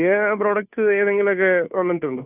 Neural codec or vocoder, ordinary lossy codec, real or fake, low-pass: codec, 16 kHz, 2 kbps, FunCodec, trained on Chinese and English, 25 frames a second; none; fake; 3.6 kHz